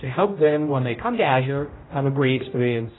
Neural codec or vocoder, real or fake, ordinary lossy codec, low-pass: codec, 16 kHz, 0.5 kbps, X-Codec, HuBERT features, trained on general audio; fake; AAC, 16 kbps; 7.2 kHz